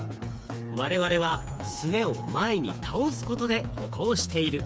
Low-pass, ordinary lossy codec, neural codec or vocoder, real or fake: none; none; codec, 16 kHz, 4 kbps, FreqCodec, smaller model; fake